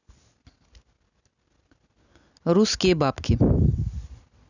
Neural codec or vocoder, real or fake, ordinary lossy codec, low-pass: none; real; none; 7.2 kHz